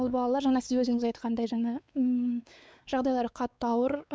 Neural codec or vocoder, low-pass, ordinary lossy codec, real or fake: codec, 16 kHz, 4 kbps, X-Codec, WavLM features, trained on Multilingual LibriSpeech; none; none; fake